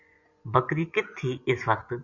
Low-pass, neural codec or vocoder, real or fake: 7.2 kHz; vocoder, 24 kHz, 100 mel bands, Vocos; fake